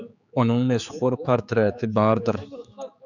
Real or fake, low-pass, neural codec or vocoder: fake; 7.2 kHz; codec, 16 kHz, 4 kbps, X-Codec, HuBERT features, trained on general audio